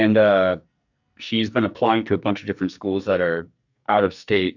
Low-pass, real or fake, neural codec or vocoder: 7.2 kHz; fake; codec, 44.1 kHz, 2.6 kbps, SNAC